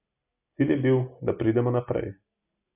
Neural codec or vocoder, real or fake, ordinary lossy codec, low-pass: none; real; none; 3.6 kHz